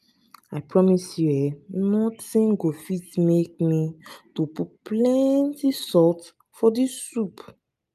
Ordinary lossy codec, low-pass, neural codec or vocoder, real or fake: none; 14.4 kHz; none; real